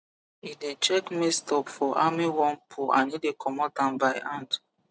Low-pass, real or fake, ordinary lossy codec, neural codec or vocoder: none; real; none; none